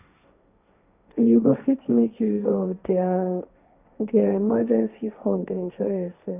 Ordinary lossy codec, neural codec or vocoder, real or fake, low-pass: none; codec, 16 kHz, 1.1 kbps, Voila-Tokenizer; fake; 3.6 kHz